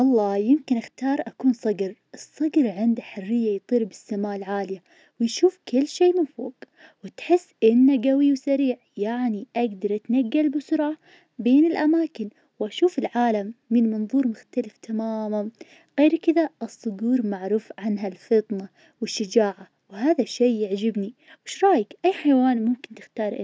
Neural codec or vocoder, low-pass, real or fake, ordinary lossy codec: none; none; real; none